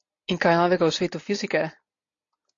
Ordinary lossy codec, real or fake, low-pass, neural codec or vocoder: AAC, 48 kbps; real; 7.2 kHz; none